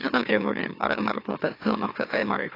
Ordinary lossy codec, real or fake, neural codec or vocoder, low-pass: AAC, 32 kbps; fake; autoencoder, 44.1 kHz, a latent of 192 numbers a frame, MeloTTS; 5.4 kHz